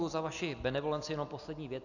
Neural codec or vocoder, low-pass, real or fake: none; 7.2 kHz; real